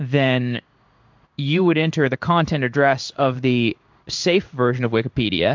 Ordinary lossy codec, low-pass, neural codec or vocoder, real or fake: MP3, 64 kbps; 7.2 kHz; codec, 16 kHz in and 24 kHz out, 1 kbps, XY-Tokenizer; fake